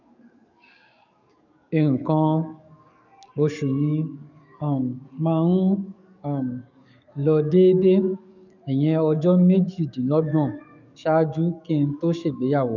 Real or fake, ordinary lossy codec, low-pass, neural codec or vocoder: fake; none; 7.2 kHz; codec, 44.1 kHz, 7.8 kbps, DAC